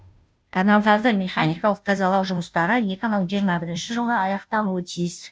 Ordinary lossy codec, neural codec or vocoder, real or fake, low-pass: none; codec, 16 kHz, 0.5 kbps, FunCodec, trained on Chinese and English, 25 frames a second; fake; none